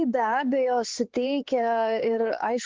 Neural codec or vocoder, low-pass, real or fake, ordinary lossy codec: codec, 16 kHz, 4 kbps, X-Codec, HuBERT features, trained on LibriSpeech; 7.2 kHz; fake; Opus, 16 kbps